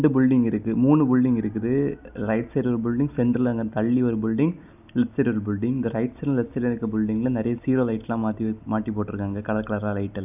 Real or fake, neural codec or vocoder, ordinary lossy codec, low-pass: real; none; none; 3.6 kHz